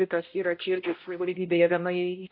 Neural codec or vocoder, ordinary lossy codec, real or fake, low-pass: codec, 16 kHz, 0.5 kbps, X-Codec, HuBERT features, trained on balanced general audio; AAC, 32 kbps; fake; 5.4 kHz